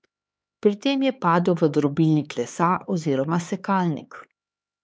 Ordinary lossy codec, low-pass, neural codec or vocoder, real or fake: none; none; codec, 16 kHz, 4 kbps, X-Codec, HuBERT features, trained on LibriSpeech; fake